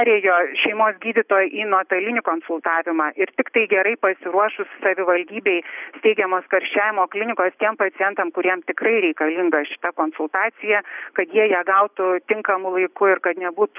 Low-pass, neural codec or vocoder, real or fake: 3.6 kHz; none; real